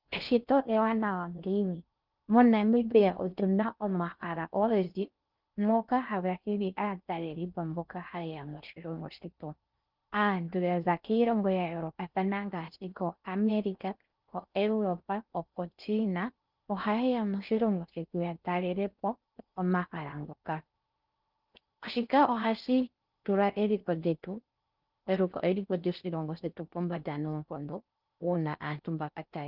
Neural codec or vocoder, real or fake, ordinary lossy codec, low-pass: codec, 16 kHz in and 24 kHz out, 0.6 kbps, FocalCodec, streaming, 4096 codes; fake; Opus, 24 kbps; 5.4 kHz